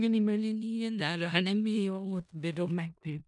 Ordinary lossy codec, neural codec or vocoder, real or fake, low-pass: none; codec, 16 kHz in and 24 kHz out, 0.4 kbps, LongCat-Audio-Codec, four codebook decoder; fake; 10.8 kHz